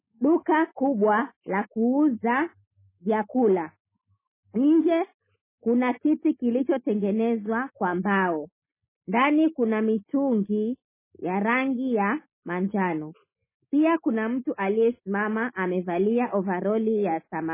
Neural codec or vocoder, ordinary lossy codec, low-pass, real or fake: none; MP3, 16 kbps; 3.6 kHz; real